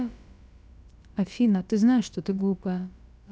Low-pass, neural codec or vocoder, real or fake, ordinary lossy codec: none; codec, 16 kHz, about 1 kbps, DyCAST, with the encoder's durations; fake; none